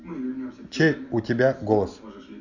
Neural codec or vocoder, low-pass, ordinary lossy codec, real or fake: none; 7.2 kHz; none; real